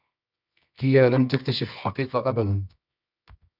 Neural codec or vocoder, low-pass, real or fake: codec, 24 kHz, 0.9 kbps, WavTokenizer, medium music audio release; 5.4 kHz; fake